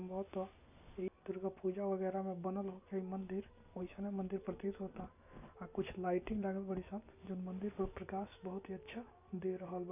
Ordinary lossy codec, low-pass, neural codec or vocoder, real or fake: none; 3.6 kHz; none; real